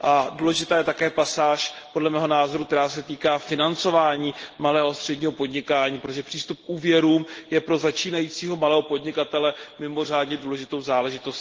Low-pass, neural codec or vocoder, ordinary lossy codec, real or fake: 7.2 kHz; none; Opus, 16 kbps; real